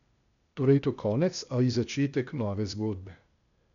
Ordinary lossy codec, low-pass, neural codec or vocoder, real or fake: none; 7.2 kHz; codec, 16 kHz, 0.8 kbps, ZipCodec; fake